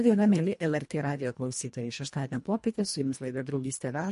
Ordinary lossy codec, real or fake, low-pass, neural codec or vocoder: MP3, 48 kbps; fake; 10.8 kHz; codec, 24 kHz, 1.5 kbps, HILCodec